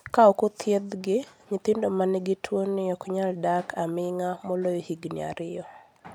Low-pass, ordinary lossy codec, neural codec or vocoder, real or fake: 19.8 kHz; none; none; real